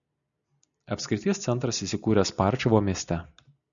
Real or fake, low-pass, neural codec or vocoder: real; 7.2 kHz; none